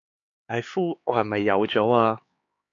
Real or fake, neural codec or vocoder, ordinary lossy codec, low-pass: fake; codec, 16 kHz, 4 kbps, X-Codec, HuBERT features, trained on LibriSpeech; AAC, 48 kbps; 7.2 kHz